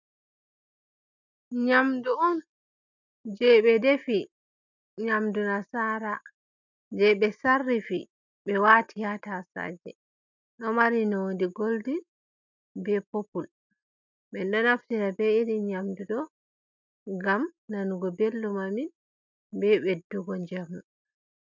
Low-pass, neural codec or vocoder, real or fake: 7.2 kHz; none; real